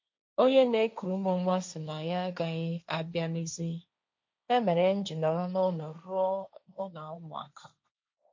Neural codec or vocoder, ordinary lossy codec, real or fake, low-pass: codec, 16 kHz, 1.1 kbps, Voila-Tokenizer; MP3, 48 kbps; fake; 7.2 kHz